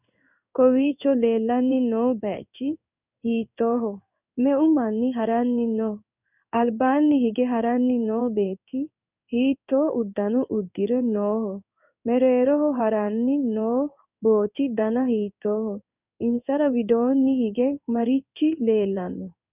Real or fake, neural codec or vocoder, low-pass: fake; codec, 16 kHz in and 24 kHz out, 1 kbps, XY-Tokenizer; 3.6 kHz